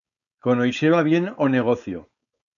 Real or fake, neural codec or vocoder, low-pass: fake; codec, 16 kHz, 4.8 kbps, FACodec; 7.2 kHz